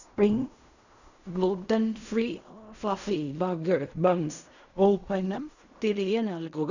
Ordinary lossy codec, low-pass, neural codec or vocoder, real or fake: none; 7.2 kHz; codec, 16 kHz in and 24 kHz out, 0.4 kbps, LongCat-Audio-Codec, fine tuned four codebook decoder; fake